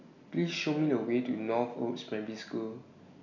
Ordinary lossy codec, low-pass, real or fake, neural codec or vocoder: none; 7.2 kHz; real; none